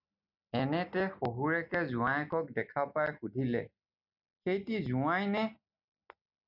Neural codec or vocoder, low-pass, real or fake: none; 5.4 kHz; real